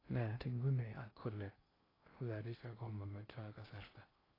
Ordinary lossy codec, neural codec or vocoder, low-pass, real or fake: AAC, 24 kbps; codec, 16 kHz in and 24 kHz out, 0.6 kbps, FocalCodec, streaming, 2048 codes; 5.4 kHz; fake